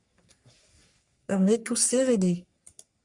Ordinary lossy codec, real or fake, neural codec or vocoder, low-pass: MP3, 96 kbps; fake; codec, 44.1 kHz, 3.4 kbps, Pupu-Codec; 10.8 kHz